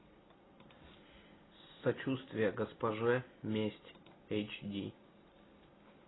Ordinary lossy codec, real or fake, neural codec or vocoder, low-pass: AAC, 16 kbps; real; none; 7.2 kHz